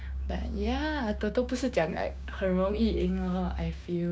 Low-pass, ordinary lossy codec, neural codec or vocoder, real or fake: none; none; codec, 16 kHz, 6 kbps, DAC; fake